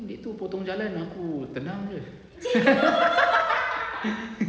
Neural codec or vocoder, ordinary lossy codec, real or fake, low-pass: none; none; real; none